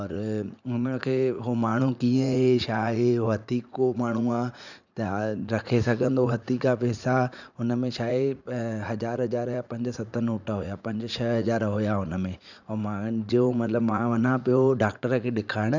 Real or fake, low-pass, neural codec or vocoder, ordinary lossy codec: fake; 7.2 kHz; vocoder, 44.1 kHz, 80 mel bands, Vocos; none